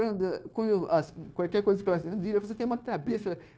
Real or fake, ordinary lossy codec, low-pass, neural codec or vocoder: fake; none; none; codec, 16 kHz, 0.9 kbps, LongCat-Audio-Codec